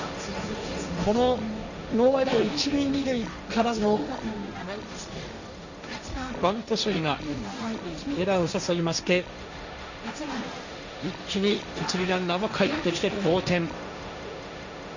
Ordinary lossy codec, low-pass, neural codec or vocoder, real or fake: none; 7.2 kHz; codec, 16 kHz, 1.1 kbps, Voila-Tokenizer; fake